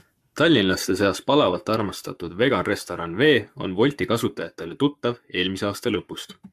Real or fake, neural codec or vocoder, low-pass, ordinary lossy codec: fake; codec, 44.1 kHz, 7.8 kbps, Pupu-Codec; 14.4 kHz; AAC, 96 kbps